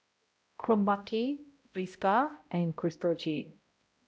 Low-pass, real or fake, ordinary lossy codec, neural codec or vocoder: none; fake; none; codec, 16 kHz, 0.5 kbps, X-Codec, HuBERT features, trained on balanced general audio